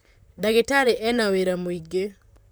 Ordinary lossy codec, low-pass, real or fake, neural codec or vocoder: none; none; fake; vocoder, 44.1 kHz, 128 mel bands, Pupu-Vocoder